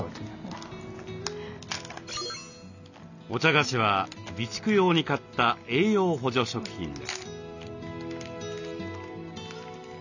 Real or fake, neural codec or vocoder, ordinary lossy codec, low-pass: real; none; none; 7.2 kHz